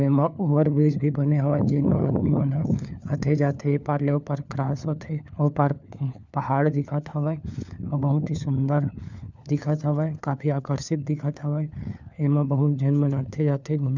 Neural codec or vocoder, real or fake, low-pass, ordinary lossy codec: codec, 16 kHz, 4 kbps, FunCodec, trained on LibriTTS, 50 frames a second; fake; 7.2 kHz; none